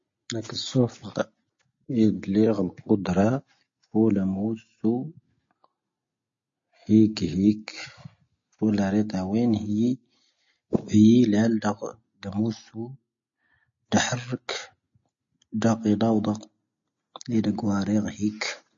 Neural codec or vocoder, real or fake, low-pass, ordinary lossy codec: none; real; 7.2 kHz; MP3, 32 kbps